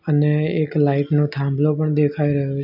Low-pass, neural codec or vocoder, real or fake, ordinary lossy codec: 5.4 kHz; none; real; none